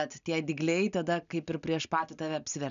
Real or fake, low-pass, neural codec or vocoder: real; 7.2 kHz; none